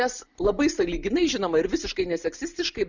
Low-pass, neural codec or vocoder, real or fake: 7.2 kHz; none; real